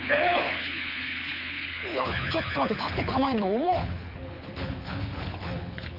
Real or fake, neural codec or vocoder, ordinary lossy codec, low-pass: fake; codec, 24 kHz, 6 kbps, HILCodec; none; 5.4 kHz